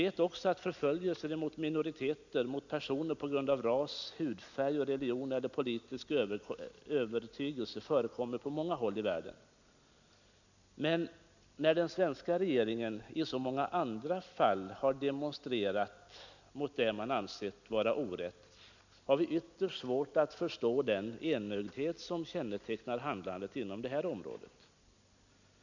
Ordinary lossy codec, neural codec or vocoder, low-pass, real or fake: none; none; 7.2 kHz; real